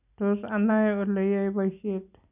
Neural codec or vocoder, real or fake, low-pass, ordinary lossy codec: none; real; 3.6 kHz; none